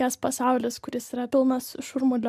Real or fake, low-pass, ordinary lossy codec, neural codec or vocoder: real; 14.4 kHz; MP3, 96 kbps; none